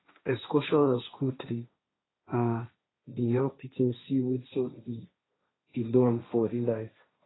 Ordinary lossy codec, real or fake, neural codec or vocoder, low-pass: AAC, 16 kbps; fake; codec, 16 kHz, 1.1 kbps, Voila-Tokenizer; 7.2 kHz